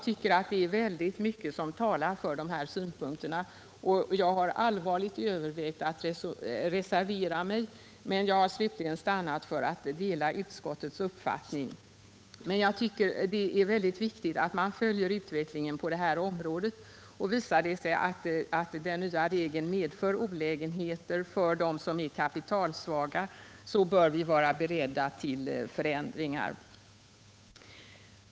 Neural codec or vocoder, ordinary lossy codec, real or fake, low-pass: codec, 16 kHz, 8 kbps, FunCodec, trained on Chinese and English, 25 frames a second; none; fake; none